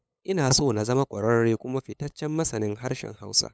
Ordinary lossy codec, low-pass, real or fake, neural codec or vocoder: none; none; fake; codec, 16 kHz, 8 kbps, FunCodec, trained on LibriTTS, 25 frames a second